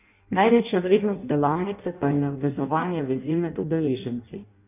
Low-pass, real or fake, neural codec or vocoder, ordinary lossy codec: 3.6 kHz; fake; codec, 16 kHz in and 24 kHz out, 0.6 kbps, FireRedTTS-2 codec; none